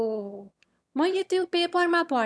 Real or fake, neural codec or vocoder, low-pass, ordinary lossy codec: fake; autoencoder, 22.05 kHz, a latent of 192 numbers a frame, VITS, trained on one speaker; none; none